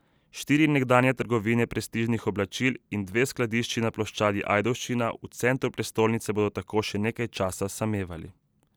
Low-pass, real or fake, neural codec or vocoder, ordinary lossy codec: none; real; none; none